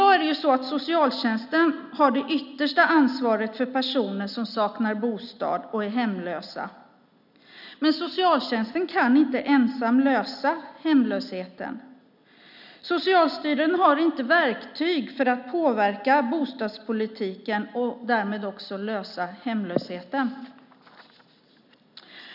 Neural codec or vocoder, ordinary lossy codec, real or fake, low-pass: none; none; real; 5.4 kHz